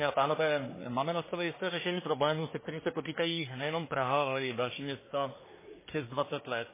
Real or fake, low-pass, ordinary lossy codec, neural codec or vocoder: fake; 3.6 kHz; MP3, 16 kbps; codec, 24 kHz, 1 kbps, SNAC